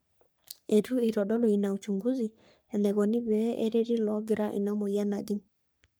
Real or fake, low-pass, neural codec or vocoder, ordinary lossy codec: fake; none; codec, 44.1 kHz, 3.4 kbps, Pupu-Codec; none